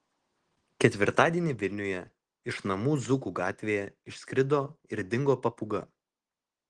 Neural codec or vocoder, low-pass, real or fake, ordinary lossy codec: none; 9.9 kHz; real; Opus, 16 kbps